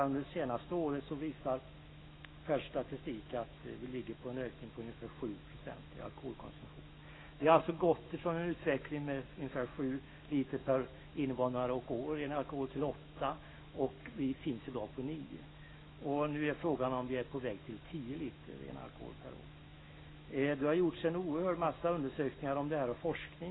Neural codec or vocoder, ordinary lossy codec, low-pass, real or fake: autoencoder, 48 kHz, 128 numbers a frame, DAC-VAE, trained on Japanese speech; AAC, 16 kbps; 7.2 kHz; fake